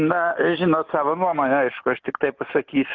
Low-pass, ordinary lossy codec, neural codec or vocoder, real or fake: 7.2 kHz; Opus, 24 kbps; none; real